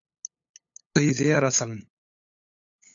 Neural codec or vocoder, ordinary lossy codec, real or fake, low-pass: codec, 16 kHz, 8 kbps, FunCodec, trained on LibriTTS, 25 frames a second; AAC, 64 kbps; fake; 7.2 kHz